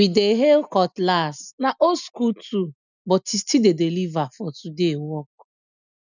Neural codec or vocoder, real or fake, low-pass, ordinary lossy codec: none; real; 7.2 kHz; none